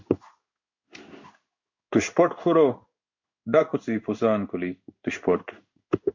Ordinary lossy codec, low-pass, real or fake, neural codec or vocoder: MP3, 48 kbps; 7.2 kHz; fake; codec, 16 kHz in and 24 kHz out, 1 kbps, XY-Tokenizer